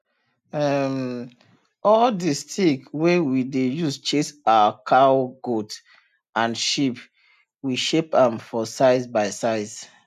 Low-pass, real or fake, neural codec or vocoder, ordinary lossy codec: 14.4 kHz; real; none; none